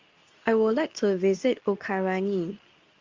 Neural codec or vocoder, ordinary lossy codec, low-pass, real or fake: codec, 24 kHz, 0.9 kbps, WavTokenizer, medium speech release version 2; Opus, 32 kbps; 7.2 kHz; fake